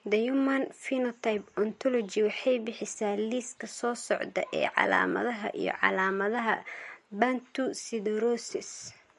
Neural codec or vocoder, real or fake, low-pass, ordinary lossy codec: none; real; 14.4 kHz; MP3, 48 kbps